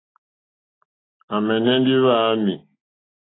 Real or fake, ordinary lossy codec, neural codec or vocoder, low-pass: real; AAC, 16 kbps; none; 7.2 kHz